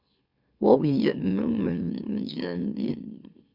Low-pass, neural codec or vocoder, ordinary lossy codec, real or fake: 5.4 kHz; autoencoder, 44.1 kHz, a latent of 192 numbers a frame, MeloTTS; Opus, 64 kbps; fake